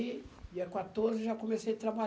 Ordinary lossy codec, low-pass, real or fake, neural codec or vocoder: none; none; real; none